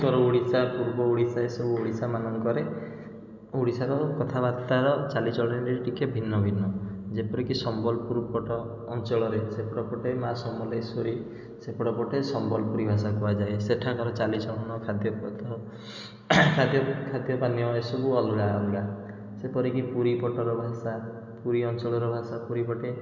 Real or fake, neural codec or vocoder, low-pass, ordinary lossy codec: real; none; 7.2 kHz; none